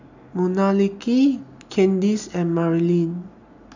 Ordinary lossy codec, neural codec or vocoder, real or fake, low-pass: none; none; real; 7.2 kHz